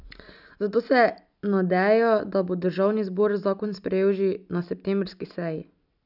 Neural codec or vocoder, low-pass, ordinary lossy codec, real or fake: none; 5.4 kHz; none; real